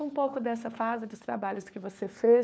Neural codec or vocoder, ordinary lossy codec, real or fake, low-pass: codec, 16 kHz, 4 kbps, FunCodec, trained on LibriTTS, 50 frames a second; none; fake; none